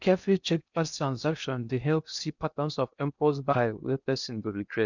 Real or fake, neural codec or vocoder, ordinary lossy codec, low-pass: fake; codec, 16 kHz in and 24 kHz out, 0.6 kbps, FocalCodec, streaming, 2048 codes; none; 7.2 kHz